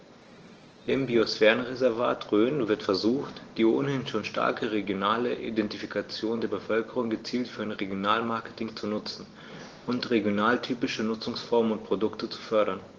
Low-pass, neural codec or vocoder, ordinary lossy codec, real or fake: 7.2 kHz; none; Opus, 16 kbps; real